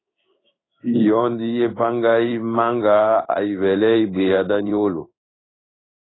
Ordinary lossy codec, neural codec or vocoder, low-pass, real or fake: AAC, 16 kbps; codec, 16 kHz in and 24 kHz out, 1 kbps, XY-Tokenizer; 7.2 kHz; fake